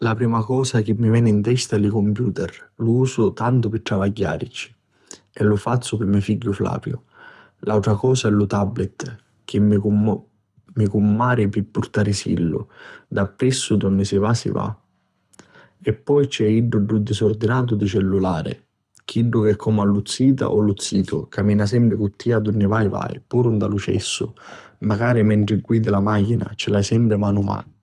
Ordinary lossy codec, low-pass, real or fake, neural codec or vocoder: none; none; fake; codec, 24 kHz, 6 kbps, HILCodec